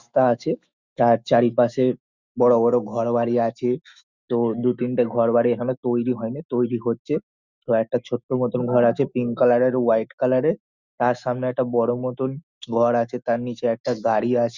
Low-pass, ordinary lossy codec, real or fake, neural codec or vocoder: 7.2 kHz; Opus, 64 kbps; fake; autoencoder, 48 kHz, 128 numbers a frame, DAC-VAE, trained on Japanese speech